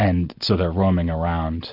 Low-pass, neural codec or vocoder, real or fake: 5.4 kHz; none; real